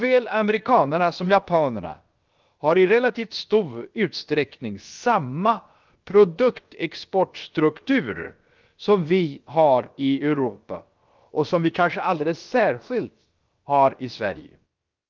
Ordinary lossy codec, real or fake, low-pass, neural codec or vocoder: Opus, 32 kbps; fake; 7.2 kHz; codec, 16 kHz, about 1 kbps, DyCAST, with the encoder's durations